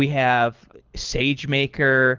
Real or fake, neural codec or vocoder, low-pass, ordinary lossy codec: fake; codec, 24 kHz, 6 kbps, HILCodec; 7.2 kHz; Opus, 32 kbps